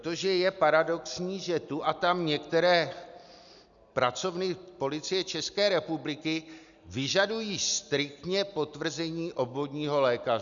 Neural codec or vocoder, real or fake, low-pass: none; real; 7.2 kHz